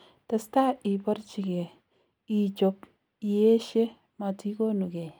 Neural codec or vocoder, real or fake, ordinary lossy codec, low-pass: none; real; none; none